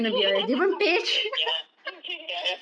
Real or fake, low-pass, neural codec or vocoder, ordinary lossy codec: real; 9.9 kHz; none; none